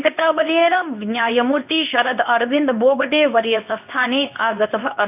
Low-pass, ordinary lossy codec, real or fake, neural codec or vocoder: 3.6 kHz; none; fake; codec, 24 kHz, 0.9 kbps, WavTokenizer, medium speech release version 2